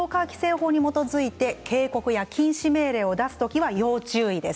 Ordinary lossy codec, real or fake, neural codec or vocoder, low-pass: none; real; none; none